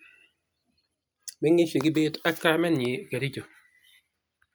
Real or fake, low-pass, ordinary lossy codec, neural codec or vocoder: real; none; none; none